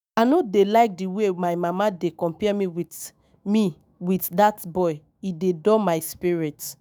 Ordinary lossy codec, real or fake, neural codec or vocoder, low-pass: none; fake; autoencoder, 48 kHz, 128 numbers a frame, DAC-VAE, trained on Japanese speech; none